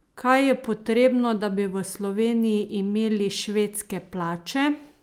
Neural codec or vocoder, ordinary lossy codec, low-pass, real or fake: none; Opus, 32 kbps; 19.8 kHz; real